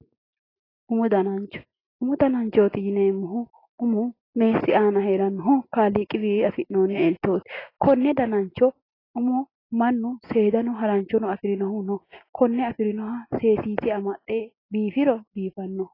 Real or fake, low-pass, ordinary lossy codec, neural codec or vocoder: real; 5.4 kHz; AAC, 24 kbps; none